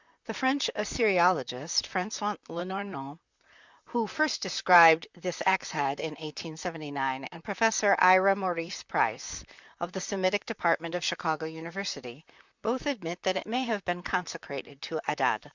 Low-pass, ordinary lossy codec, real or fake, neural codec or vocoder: 7.2 kHz; Opus, 64 kbps; fake; vocoder, 44.1 kHz, 128 mel bands, Pupu-Vocoder